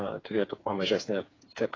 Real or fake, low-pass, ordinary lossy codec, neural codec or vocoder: fake; 7.2 kHz; AAC, 32 kbps; codec, 16 kHz, 4 kbps, FreqCodec, smaller model